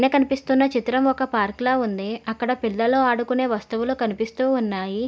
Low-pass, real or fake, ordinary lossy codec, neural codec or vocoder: none; real; none; none